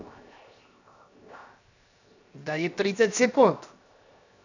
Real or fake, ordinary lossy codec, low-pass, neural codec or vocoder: fake; none; 7.2 kHz; codec, 16 kHz, 0.7 kbps, FocalCodec